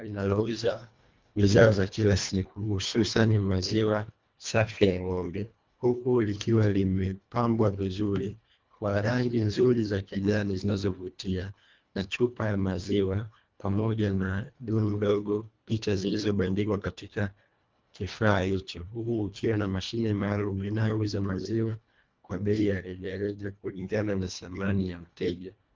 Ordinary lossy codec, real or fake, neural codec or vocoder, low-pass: Opus, 24 kbps; fake; codec, 24 kHz, 1.5 kbps, HILCodec; 7.2 kHz